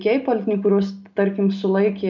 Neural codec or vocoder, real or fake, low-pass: none; real; 7.2 kHz